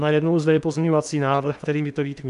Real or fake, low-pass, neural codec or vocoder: fake; 10.8 kHz; codec, 16 kHz in and 24 kHz out, 0.8 kbps, FocalCodec, streaming, 65536 codes